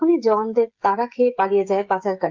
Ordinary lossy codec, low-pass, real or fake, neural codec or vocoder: Opus, 32 kbps; 7.2 kHz; fake; codec, 16 kHz, 16 kbps, FreqCodec, smaller model